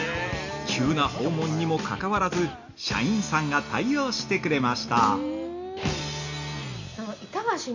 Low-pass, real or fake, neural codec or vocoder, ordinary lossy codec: 7.2 kHz; real; none; none